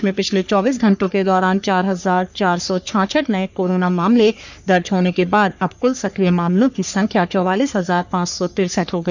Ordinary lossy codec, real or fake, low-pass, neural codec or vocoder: none; fake; 7.2 kHz; codec, 44.1 kHz, 3.4 kbps, Pupu-Codec